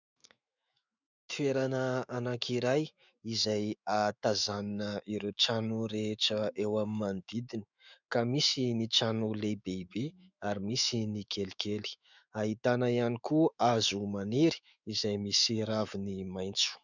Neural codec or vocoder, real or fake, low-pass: autoencoder, 48 kHz, 128 numbers a frame, DAC-VAE, trained on Japanese speech; fake; 7.2 kHz